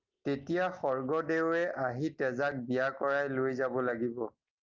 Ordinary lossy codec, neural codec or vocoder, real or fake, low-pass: Opus, 24 kbps; none; real; 7.2 kHz